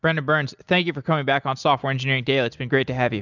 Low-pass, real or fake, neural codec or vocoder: 7.2 kHz; real; none